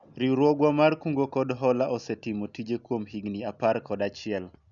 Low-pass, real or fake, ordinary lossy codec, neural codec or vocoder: 7.2 kHz; real; none; none